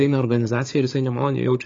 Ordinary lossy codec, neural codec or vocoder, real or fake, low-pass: AAC, 32 kbps; codec, 16 kHz, 4 kbps, FunCodec, trained on Chinese and English, 50 frames a second; fake; 7.2 kHz